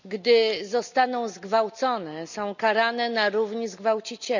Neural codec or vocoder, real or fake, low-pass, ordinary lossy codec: none; real; 7.2 kHz; none